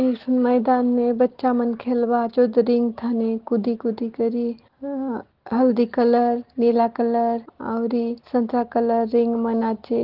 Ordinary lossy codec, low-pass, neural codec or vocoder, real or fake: Opus, 16 kbps; 5.4 kHz; none; real